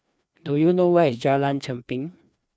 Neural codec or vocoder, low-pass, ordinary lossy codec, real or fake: codec, 16 kHz, 2 kbps, FreqCodec, larger model; none; none; fake